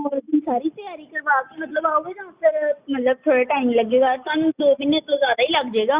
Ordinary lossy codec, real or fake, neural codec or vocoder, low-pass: Opus, 64 kbps; real; none; 3.6 kHz